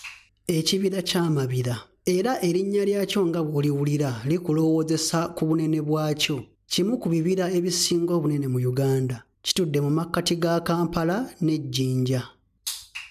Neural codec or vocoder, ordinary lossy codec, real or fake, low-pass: none; none; real; 14.4 kHz